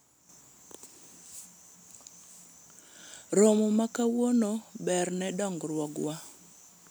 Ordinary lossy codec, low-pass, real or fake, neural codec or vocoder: none; none; real; none